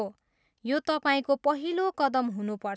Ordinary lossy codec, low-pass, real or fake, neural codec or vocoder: none; none; real; none